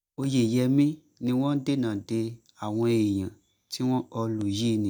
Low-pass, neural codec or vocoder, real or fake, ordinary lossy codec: none; none; real; none